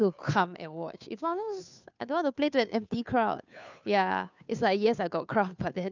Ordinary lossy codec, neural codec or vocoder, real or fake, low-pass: none; codec, 16 kHz, 2 kbps, FunCodec, trained on Chinese and English, 25 frames a second; fake; 7.2 kHz